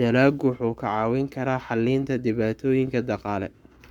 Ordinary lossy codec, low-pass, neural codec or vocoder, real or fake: none; 19.8 kHz; codec, 44.1 kHz, 7.8 kbps, Pupu-Codec; fake